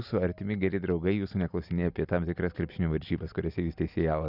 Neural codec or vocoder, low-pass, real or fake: none; 5.4 kHz; real